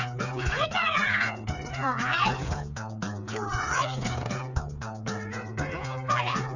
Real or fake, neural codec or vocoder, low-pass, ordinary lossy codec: fake; codec, 16 kHz, 4 kbps, FreqCodec, smaller model; 7.2 kHz; none